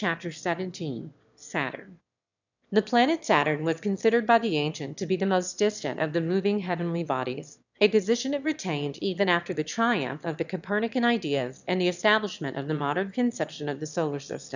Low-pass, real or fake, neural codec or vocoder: 7.2 kHz; fake; autoencoder, 22.05 kHz, a latent of 192 numbers a frame, VITS, trained on one speaker